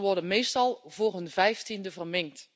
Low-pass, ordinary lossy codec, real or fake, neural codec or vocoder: none; none; real; none